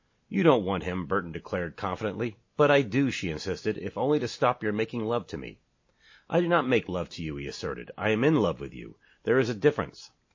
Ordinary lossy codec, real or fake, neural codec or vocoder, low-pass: MP3, 32 kbps; real; none; 7.2 kHz